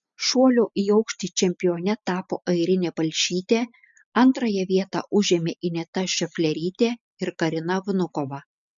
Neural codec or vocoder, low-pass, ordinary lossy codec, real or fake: none; 7.2 kHz; MP3, 64 kbps; real